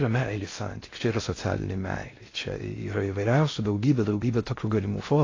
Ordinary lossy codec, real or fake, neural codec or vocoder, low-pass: AAC, 32 kbps; fake; codec, 16 kHz in and 24 kHz out, 0.6 kbps, FocalCodec, streaming, 2048 codes; 7.2 kHz